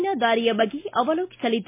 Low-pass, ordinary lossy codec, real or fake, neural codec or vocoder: 3.6 kHz; MP3, 24 kbps; real; none